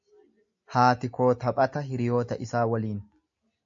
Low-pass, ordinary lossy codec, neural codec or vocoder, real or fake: 7.2 kHz; AAC, 64 kbps; none; real